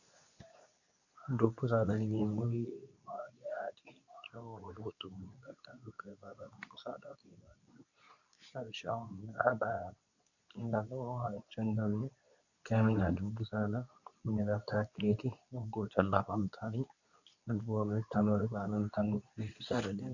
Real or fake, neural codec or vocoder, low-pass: fake; codec, 24 kHz, 0.9 kbps, WavTokenizer, medium speech release version 2; 7.2 kHz